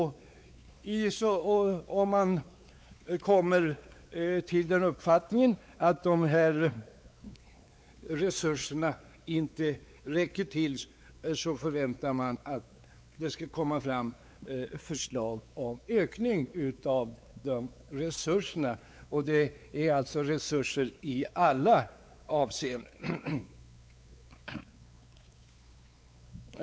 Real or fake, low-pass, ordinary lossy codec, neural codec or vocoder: fake; none; none; codec, 16 kHz, 4 kbps, X-Codec, WavLM features, trained on Multilingual LibriSpeech